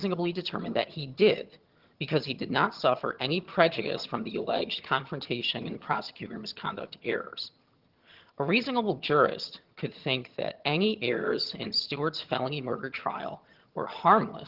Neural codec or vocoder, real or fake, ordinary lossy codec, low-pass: vocoder, 22.05 kHz, 80 mel bands, HiFi-GAN; fake; Opus, 16 kbps; 5.4 kHz